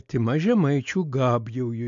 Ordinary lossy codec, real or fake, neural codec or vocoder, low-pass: AAC, 64 kbps; real; none; 7.2 kHz